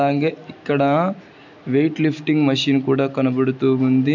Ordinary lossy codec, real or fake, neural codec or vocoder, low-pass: none; real; none; 7.2 kHz